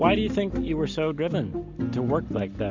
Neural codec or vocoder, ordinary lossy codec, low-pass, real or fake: none; MP3, 64 kbps; 7.2 kHz; real